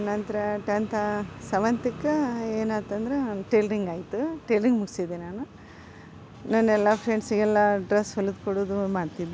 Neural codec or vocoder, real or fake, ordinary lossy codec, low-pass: none; real; none; none